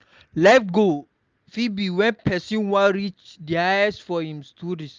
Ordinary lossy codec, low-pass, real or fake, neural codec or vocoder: Opus, 24 kbps; 7.2 kHz; real; none